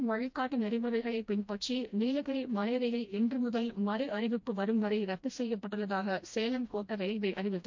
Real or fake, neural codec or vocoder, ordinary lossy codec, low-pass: fake; codec, 16 kHz, 1 kbps, FreqCodec, smaller model; MP3, 48 kbps; 7.2 kHz